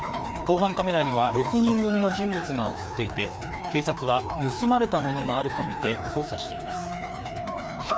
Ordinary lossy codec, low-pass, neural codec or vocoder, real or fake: none; none; codec, 16 kHz, 2 kbps, FreqCodec, larger model; fake